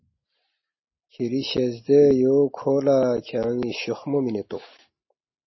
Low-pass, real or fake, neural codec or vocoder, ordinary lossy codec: 7.2 kHz; real; none; MP3, 24 kbps